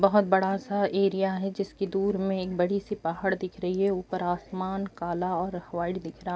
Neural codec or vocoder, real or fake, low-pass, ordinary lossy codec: none; real; none; none